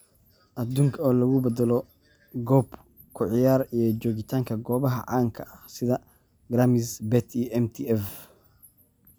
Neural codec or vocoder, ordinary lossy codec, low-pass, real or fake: none; none; none; real